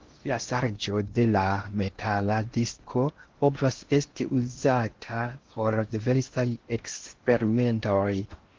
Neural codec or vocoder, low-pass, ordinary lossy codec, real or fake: codec, 16 kHz in and 24 kHz out, 0.6 kbps, FocalCodec, streaming, 4096 codes; 7.2 kHz; Opus, 16 kbps; fake